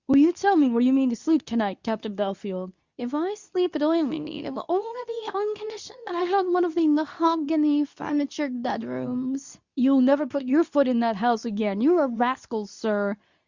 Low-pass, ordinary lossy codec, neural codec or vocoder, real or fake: 7.2 kHz; Opus, 64 kbps; codec, 24 kHz, 0.9 kbps, WavTokenizer, medium speech release version 2; fake